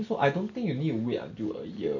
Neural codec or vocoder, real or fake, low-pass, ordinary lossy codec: none; real; 7.2 kHz; none